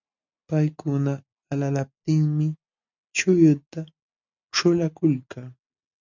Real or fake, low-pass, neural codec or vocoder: real; 7.2 kHz; none